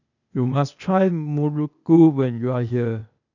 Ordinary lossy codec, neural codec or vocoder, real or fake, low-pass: none; codec, 16 kHz, 0.8 kbps, ZipCodec; fake; 7.2 kHz